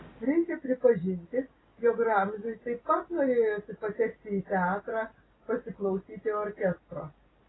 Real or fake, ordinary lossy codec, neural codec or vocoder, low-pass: real; AAC, 16 kbps; none; 7.2 kHz